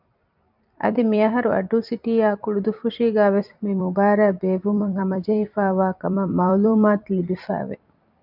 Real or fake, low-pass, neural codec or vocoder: fake; 5.4 kHz; vocoder, 44.1 kHz, 128 mel bands every 256 samples, BigVGAN v2